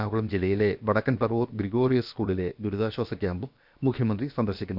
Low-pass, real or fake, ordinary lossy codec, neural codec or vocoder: 5.4 kHz; fake; none; codec, 16 kHz, about 1 kbps, DyCAST, with the encoder's durations